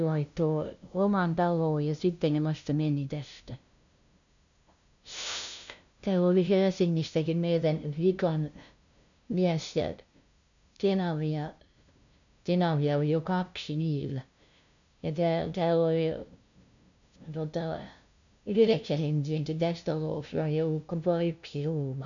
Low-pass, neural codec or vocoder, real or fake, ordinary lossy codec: 7.2 kHz; codec, 16 kHz, 0.5 kbps, FunCodec, trained on LibriTTS, 25 frames a second; fake; none